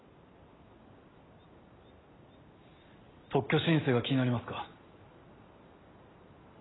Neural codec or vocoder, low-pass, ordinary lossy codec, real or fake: none; 7.2 kHz; AAC, 16 kbps; real